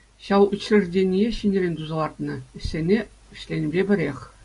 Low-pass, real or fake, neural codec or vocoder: 10.8 kHz; real; none